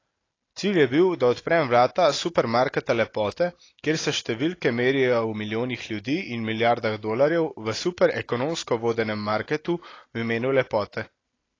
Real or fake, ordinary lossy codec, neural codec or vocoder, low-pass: real; AAC, 32 kbps; none; 7.2 kHz